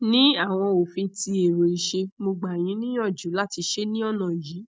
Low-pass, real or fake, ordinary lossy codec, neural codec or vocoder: none; real; none; none